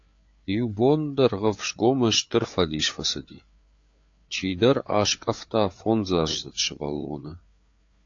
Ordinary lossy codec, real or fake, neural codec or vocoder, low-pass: AAC, 48 kbps; fake; codec, 16 kHz, 4 kbps, FreqCodec, larger model; 7.2 kHz